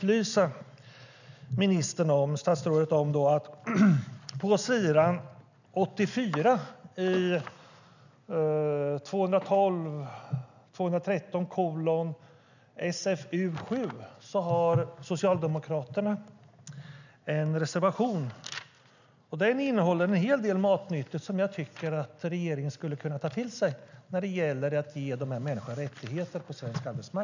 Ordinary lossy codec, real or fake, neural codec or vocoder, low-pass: none; real; none; 7.2 kHz